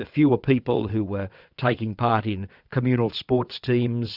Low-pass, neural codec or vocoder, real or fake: 5.4 kHz; vocoder, 22.05 kHz, 80 mel bands, WaveNeXt; fake